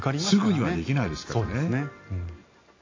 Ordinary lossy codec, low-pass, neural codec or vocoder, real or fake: AAC, 32 kbps; 7.2 kHz; none; real